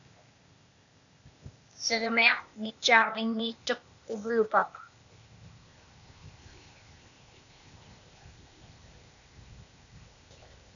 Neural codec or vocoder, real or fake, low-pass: codec, 16 kHz, 0.8 kbps, ZipCodec; fake; 7.2 kHz